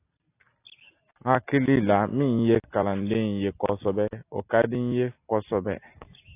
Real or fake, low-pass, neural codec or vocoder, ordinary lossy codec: real; 3.6 kHz; none; AAC, 24 kbps